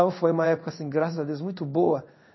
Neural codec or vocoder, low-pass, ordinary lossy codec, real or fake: codec, 16 kHz in and 24 kHz out, 1 kbps, XY-Tokenizer; 7.2 kHz; MP3, 24 kbps; fake